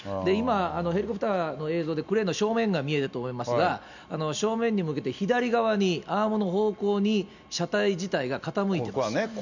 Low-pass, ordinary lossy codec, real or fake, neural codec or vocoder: 7.2 kHz; none; real; none